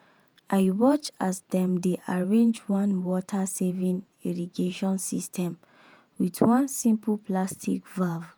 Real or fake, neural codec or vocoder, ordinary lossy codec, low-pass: fake; vocoder, 48 kHz, 128 mel bands, Vocos; none; none